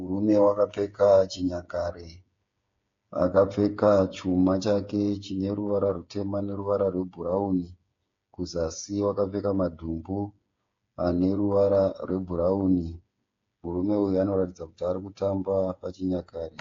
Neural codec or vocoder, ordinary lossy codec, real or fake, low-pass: codec, 16 kHz, 8 kbps, FreqCodec, smaller model; AAC, 48 kbps; fake; 7.2 kHz